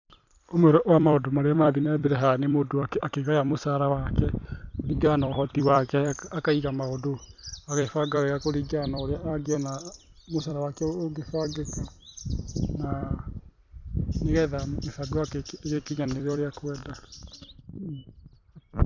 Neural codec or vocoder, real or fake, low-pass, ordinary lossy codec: vocoder, 44.1 kHz, 128 mel bands every 256 samples, BigVGAN v2; fake; 7.2 kHz; none